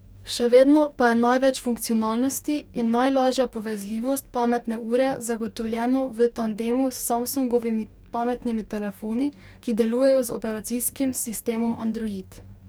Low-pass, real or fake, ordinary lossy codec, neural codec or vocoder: none; fake; none; codec, 44.1 kHz, 2.6 kbps, DAC